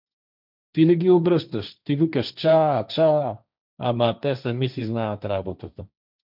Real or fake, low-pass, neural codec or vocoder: fake; 5.4 kHz; codec, 16 kHz, 1.1 kbps, Voila-Tokenizer